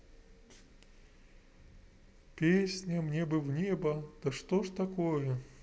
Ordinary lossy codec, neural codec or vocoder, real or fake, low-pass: none; none; real; none